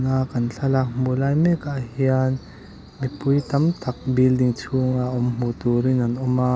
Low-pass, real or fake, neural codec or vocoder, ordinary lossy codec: none; real; none; none